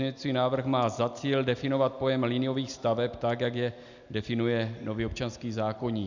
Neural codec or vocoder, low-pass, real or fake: none; 7.2 kHz; real